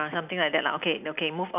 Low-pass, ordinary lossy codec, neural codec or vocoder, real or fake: 3.6 kHz; none; none; real